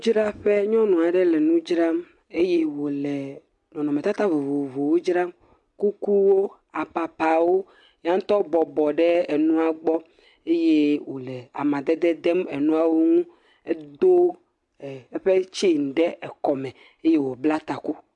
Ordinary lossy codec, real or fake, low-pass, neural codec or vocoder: MP3, 64 kbps; real; 10.8 kHz; none